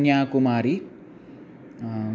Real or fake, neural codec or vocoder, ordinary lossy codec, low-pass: real; none; none; none